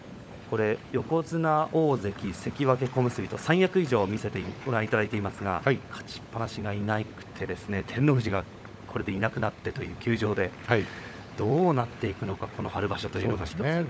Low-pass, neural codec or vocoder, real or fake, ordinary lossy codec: none; codec, 16 kHz, 16 kbps, FunCodec, trained on LibriTTS, 50 frames a second; fake; none